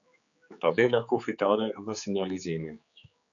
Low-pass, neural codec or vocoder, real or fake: 7.2 kHz; codec, 16 kHz, 4 kbps, X-Codec, HuBERT features, trained on general audio; fake